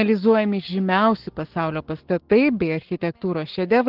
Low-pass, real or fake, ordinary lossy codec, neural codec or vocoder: 5.4 kHz; fake; Opus, 16 kbps; codec, 44.1 kHz, 7.8 kbps, Pupu-Codec